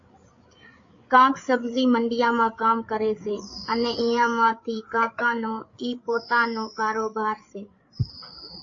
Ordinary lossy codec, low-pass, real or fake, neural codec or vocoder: AAC, 48 kbps; 7.2 kHz; fake; codec, 16 kHz, 8 kbps, FreqCodec, larger model